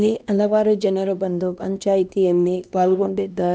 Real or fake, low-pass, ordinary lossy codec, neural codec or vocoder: fake; none; none; codec, 16 kHz, 1 kbps, X-Codec, HuBERT features, trained on LibriSpeech